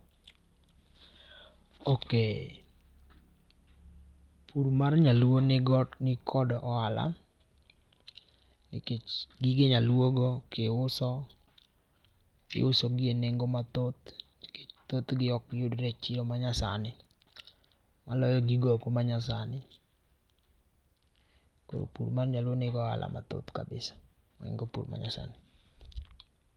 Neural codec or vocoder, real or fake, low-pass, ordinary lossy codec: none; real; 19.8 kHz; Opus, 32 kbps